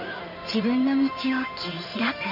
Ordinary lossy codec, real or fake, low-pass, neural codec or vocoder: none; fake; 5.4 kHz; codec, 16 kHz in and 24 kHz out, 2.2 kbps, FireRedTTS-2 codec